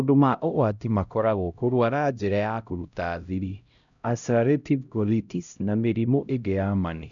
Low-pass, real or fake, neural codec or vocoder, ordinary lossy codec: 7.2 kHz; fake; codec, 16 kHz, 0.5 kbps, X-Codec, HuBERT features, trained on LibriSpeech; none